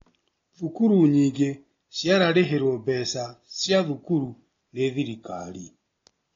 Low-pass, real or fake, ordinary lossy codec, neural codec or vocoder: 7.2 kHz; real; AAC, 32 kbps; none